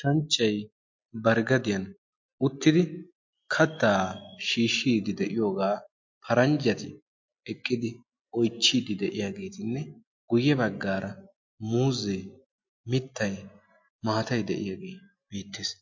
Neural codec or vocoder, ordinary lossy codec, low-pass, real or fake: none; MP3, 48 kbps; 7.2 kHz; real